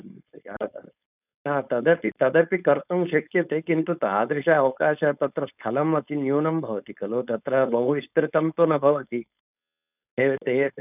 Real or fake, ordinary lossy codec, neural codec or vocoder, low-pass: fake; none; codec, 16 kHz, 4.8 kbps, FACodec; 3.6 kHz